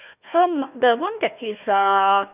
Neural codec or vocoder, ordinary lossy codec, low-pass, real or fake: codec, 16 kHz, 1 kbps, FunCodec, trained on Chinese and English, 50 frames a second; none; 3.6 kHz; fake